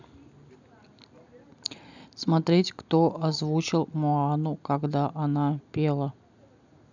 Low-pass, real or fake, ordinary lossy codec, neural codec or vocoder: 7.2 kHz; real; none; none